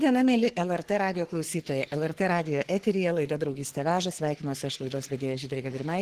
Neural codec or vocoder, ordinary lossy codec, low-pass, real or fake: codec, 44.1 kHz, 3.4 kbps, Pupu-Codec; Opus, 16 kbps; 14.4 kHz; fake